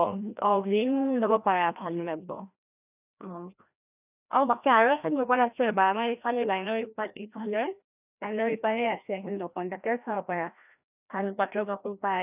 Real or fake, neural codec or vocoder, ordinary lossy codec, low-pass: fake; codec, 16 kHz, 1 kbps, FreqCodec, larger model; none; 3.6 kHz